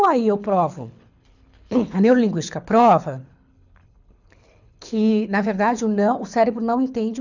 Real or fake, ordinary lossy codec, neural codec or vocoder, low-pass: fake; none; codec, 24 kHz, 6 kbps, HILCodec; 7.2 kHz